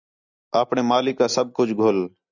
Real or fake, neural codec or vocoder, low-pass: real; none; 7.2 kHz